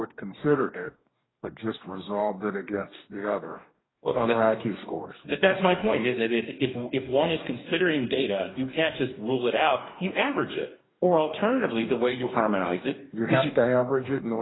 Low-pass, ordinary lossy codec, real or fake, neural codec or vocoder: 7.2 kHz; AAC, 16 kbps; fake; codec, 44.1 kHz, 2.6 kbps, DAC